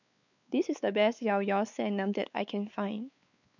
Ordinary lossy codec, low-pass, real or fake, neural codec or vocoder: none; 7.2 kHz; fake; codec, 16 kHz, 4 kbps, X-Codec, WavLM features, trained on Multilingual LibriSpeech